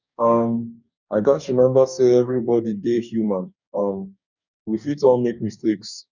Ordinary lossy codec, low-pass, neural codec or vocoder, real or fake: none; 7.2 kHz; codec, 44.1 kHz, 2.6 kbps, DAC; fake